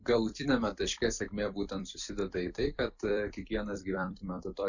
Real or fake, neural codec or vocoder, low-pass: fake; vocoder, 44.1 kHz, 128 mel bands every 256 samples, BigVGAN v2; 7.2 kHz